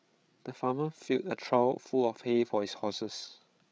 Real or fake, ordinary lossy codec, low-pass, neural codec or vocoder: fake; none; none; codec, 16 kHz, 8 kbps, FreqCodec, larger model